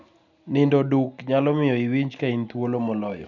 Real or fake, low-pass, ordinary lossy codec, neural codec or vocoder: real; 7.2 kHz; none; none